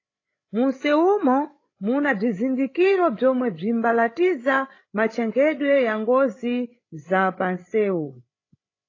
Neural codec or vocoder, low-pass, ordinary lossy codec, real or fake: codec, 16 kHz, 8 kbps, FreqCodec, larger model; 7.2 kHz; AAC, 32 kbps; fake